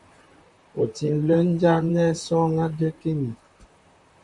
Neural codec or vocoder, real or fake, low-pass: vocoder, 44.1 kHz, 128 mel bands, Pupu-Vocoder; fake; 10.8 kHz